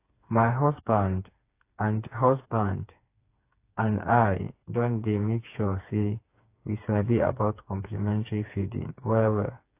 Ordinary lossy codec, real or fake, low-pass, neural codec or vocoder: AAC, 24 kbps; fake; 3.6 kHz; codec, 16 kHz, 4 kbps, FreqCodec, smaller model